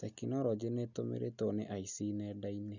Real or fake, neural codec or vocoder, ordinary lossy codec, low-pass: real; none; none; 7.2 kHz